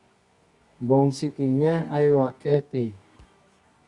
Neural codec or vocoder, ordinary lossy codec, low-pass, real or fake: codec, 24 kHz, 0.9 kbps, WavTokenizer, medium music audio release; Opus, 64 kbps; 10.8 kHz; fake